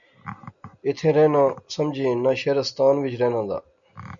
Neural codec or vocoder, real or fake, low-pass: none; real; 7.2 kHz